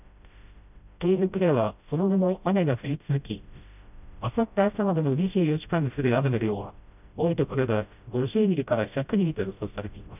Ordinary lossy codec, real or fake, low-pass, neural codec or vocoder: none; fake; 3.6 kHz; codec, 16 kHz, 0.5 kbps, FreqCodec, smaller model